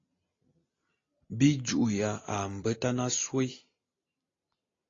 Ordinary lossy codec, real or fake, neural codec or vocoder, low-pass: AAC, 32 kbps; real; none; 7.2 kHz